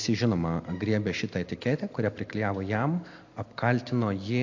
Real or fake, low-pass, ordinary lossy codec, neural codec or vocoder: real; 7.2 kHz; AAC, 48 kbps; none